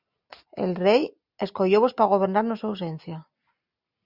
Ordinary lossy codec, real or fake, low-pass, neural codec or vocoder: Opus, 64 kbps; real; 5.4 kHz; none